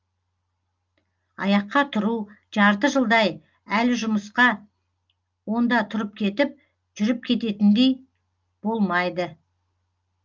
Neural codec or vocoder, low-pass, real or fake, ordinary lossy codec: none; 7.2 kHz; real; Opus, 24 kbps